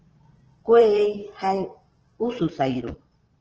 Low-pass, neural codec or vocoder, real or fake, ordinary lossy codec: 7.2 kHz; vocoder, 22.05 kHz, 80 mel bands, Vocos; fake; Opus, 16 kbps